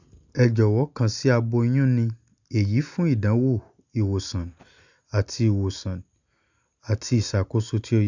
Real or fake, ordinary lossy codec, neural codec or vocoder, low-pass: real; none; none; 7.2 kHz